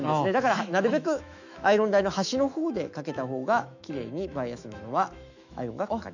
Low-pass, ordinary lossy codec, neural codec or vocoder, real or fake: 7.2 kHz; none; autoencoder, 48 kHz, 128 numbers a frame, DAC-VAE, trained on Japanese speech; fake